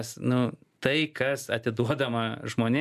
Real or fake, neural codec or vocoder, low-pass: real; none; 14.4 kHz